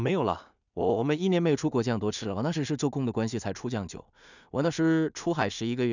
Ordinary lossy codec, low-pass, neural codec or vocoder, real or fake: none; 7.2 kHz; codec, 16 kHz in and 24 kHz out, 0.4 kbps, LongCat-Audio-Codec, two codebook decoder; fake